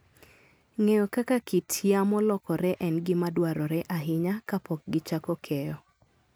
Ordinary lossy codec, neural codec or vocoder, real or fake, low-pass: none; none; real; none